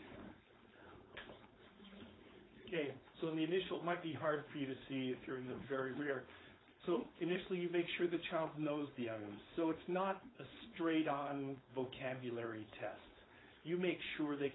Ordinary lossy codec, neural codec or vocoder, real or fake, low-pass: AAC, 16 kbps; codec, 16 kHz, 4.8 kbps, FACodec; fake; 7.2 kHz